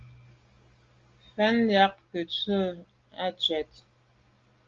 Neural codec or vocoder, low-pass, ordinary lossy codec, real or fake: none; 7.2 kHz; Opus, 32 kbps; real